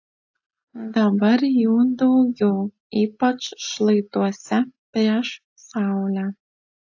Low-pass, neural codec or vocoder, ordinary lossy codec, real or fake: 7.2 kHz; none; AAC, 48 kbps; real